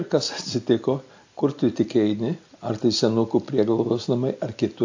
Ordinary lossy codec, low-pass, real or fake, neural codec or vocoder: AAC, 48 kbps; 7.2 kHz; real; none